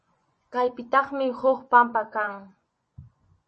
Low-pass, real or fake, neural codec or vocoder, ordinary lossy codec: 10.8 kHz; fake; vocoder, 24 kHz, 100 mel bands, Vocos; MP3, 32 kbps